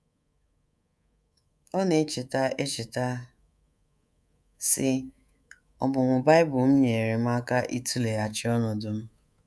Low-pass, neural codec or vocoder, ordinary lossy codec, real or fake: none; codec, 24 kHz, 3.1 kbps, DualCodec; none; fake